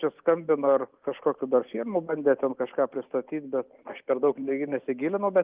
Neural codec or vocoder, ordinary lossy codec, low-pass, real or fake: none; Opus, 32 kbps; 3.6 kHz; real